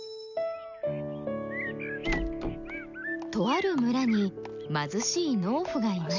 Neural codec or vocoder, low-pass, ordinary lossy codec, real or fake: none; 7.2 kHz; none; real